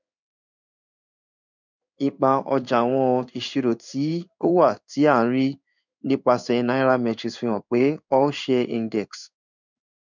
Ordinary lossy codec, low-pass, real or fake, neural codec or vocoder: none; 7.2 kHz; fake; codec, 16 kHz in and 24 kHz out, 1 kbps, XY-Tokenizer